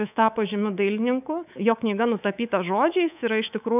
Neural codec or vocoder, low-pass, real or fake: codec, 24 kHz, 3.1 kbps, DualCodec; 3.6 kHz; fake